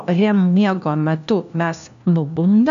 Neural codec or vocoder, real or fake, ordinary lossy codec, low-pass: codec, 16 kHz, 1 kbps, FunCodec, trained on LibriTTS, 50 frames a second; fake; AAC, 96 kbps; 7.2 kHz